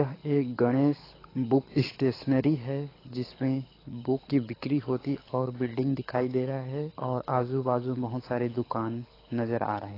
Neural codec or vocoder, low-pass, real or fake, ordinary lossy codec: codec, 24 kHz, 6 kbps, HILCodec; 5.4 kHz; fake; AAC, 24 kbps